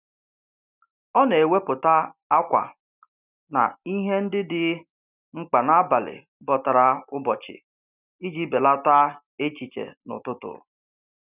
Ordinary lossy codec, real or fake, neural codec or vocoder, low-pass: none; real; none; 3.6 kHz